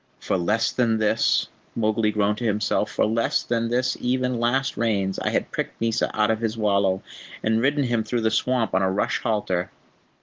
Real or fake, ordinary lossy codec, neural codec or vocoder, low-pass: real; Opus, 16 kbps; none; 7.2 kHz